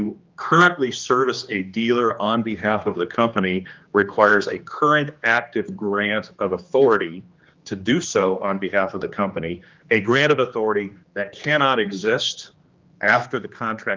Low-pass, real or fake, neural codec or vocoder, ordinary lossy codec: 7.2 kHz; fake; codec, 16 kHz, 2 kbps, X-Codec, HuBERT features, trained on general audio; Opus, 24 kbps